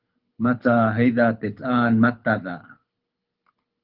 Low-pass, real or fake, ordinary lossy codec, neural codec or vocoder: 5.4 kHz; fake; Opus, 32 kbps; codec, 24 kHz, 6 kbps, HILCodec